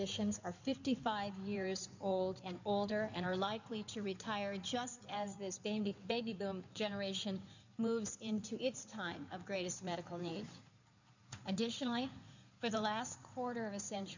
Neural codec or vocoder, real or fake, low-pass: codec, 16 kHz in and 24 kHz out, 2.2 kbps, FireRedTTS-2 codec; fake; 7.2 kHz